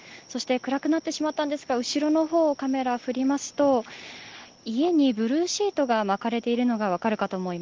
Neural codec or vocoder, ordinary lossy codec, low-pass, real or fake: none; Opus, 16 kbps; 7.2 kHz; real